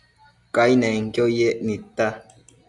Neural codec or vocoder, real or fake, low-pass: none; real; 10.8 kHz